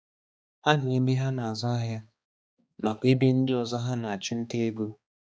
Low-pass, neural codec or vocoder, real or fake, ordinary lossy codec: none; codec, 16 kHz, 2 kbps, X-Codec, HuBERT features, trained on balanced general audio; fake; none